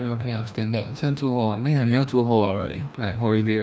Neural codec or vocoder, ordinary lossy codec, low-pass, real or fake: codec, 16 kHz, 1 kbps, FreqCodec, larger model; none; none; fake